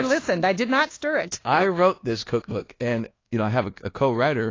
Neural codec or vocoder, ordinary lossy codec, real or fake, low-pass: codec, 24 kHz, 1.2 kbps, DualCodec; AAC, 32 kbps; fake; 7.2 kHz